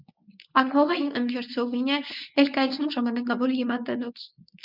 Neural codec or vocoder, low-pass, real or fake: codec, 24 kHz, 0.9 kbps, WavTokenizer, medium speech release version 1; 5.4 kHz; fake